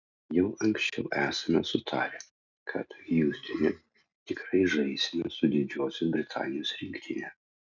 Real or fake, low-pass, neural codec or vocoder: real; 7.2 kHz; none